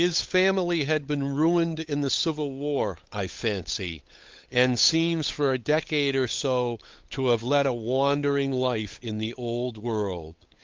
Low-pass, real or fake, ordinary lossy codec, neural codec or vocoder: 7.2 kHz; fake; Opus, 32 kbps; codec, 16 kHz, 8 kbps, FunCodec, trained on Chinese and English, 25 frames a second